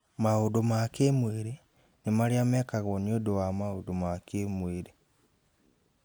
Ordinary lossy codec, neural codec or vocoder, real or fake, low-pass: none; none; real; none